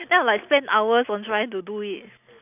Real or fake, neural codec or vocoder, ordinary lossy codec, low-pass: real; none; none; 3.6 kHz